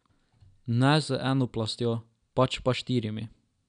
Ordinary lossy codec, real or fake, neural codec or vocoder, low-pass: none; real; none; 9.9 kHz